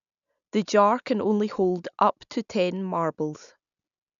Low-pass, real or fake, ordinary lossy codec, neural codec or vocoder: 7.2 kHz; real; none; none